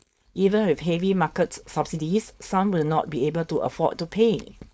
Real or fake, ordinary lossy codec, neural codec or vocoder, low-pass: fake; none; codec, 16 kHz, 4.8 kbps, FACodec; none